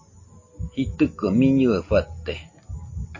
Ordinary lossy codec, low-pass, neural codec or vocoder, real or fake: MP3, 32 kbps; 7.2 kHz; none; real